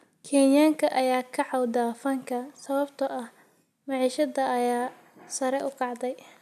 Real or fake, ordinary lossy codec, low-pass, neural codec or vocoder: real; none; 14.4 kHz; none